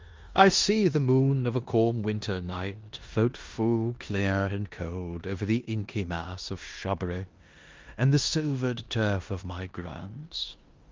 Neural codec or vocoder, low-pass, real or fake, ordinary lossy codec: codec, 16 kHz in and 24 kHz out, 0.9 kbps, LongCat-Audio-Codec, four codebook decoder; 7.2 kHz; fake; Opus, 32 kbps